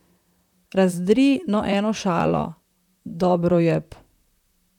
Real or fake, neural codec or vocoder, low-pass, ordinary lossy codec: fake; vocoder, 44.1 kHz, 128 mel bands, Pupu-Vocoder; 19.8 kHz; none